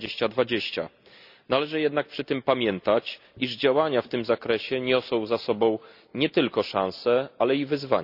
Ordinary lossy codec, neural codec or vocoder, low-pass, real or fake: none; none; 5.4 kHz; real